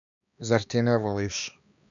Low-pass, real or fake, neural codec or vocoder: 7.2 kHz; fake; codec, 16 kHz, 2 kbps, X-Codec, HuBERT features, trained on balanced general audio